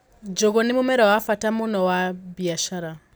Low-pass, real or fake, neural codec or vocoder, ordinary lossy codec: none; real; none; none